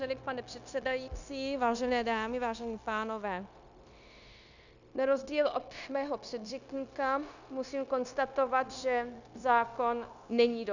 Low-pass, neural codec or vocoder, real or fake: 7.2 kHz; codec, 16 kHz, 0.9 kbps, LongCat-Audio-Codec; fake